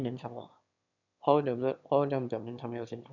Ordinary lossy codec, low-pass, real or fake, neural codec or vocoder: none; 7.2 kHz; fake; autoencoder, 22.05 kHz, a latent of 192 numbers a frame, VITS, trained on one speaker